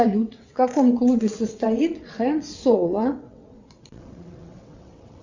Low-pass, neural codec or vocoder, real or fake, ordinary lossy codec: 7.2 kHz; vocoder, 22.05 kHz, 80 mel bands, WaveNeXt; fake; Opus, 64 kbps